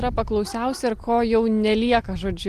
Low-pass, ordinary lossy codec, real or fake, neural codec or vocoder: 14.4 kHz; Opus, 24 kbps; real; none